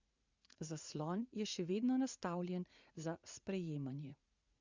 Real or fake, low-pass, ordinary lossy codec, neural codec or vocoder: fake; 7.2 kHz; Opus, 64 kbps; vocoder, 44.1 kHz, 80 mel bands, Vocos